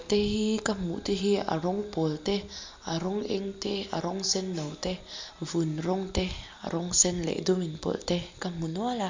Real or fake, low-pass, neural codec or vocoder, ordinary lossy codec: real; 7.2 kHz; none; MP3, 64 kbps